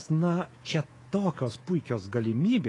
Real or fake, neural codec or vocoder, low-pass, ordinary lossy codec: fake; codec, 24 kHz, 3.1 kbps, DualCodec; 10.8 kHz; AAC, 32 kbps